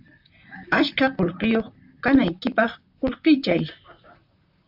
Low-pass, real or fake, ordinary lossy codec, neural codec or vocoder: 5.4 kHz; fake; AAC, 48 kbps; vocoder, 44.1 kHz, 128 mel bands, Pupu-Vocoder